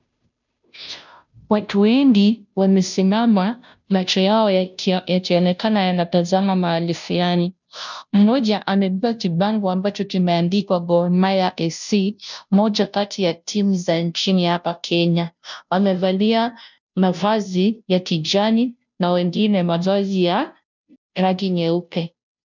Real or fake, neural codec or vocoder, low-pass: fake; codec, 16 kHz, 0.5 kbps, FunCodec, trained on Chinese and English, 25 frames a second; 7.2 kHz